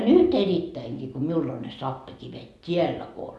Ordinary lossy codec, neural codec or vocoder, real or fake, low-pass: none; none; real; none